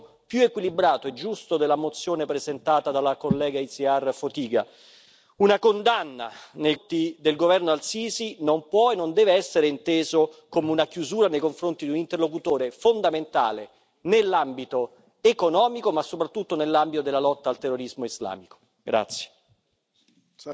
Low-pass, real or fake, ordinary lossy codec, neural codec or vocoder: none; real; none; none